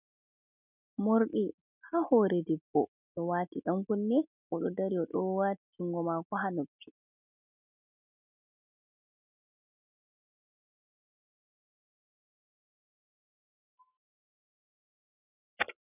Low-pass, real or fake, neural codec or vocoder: 3.6 kHz; real; none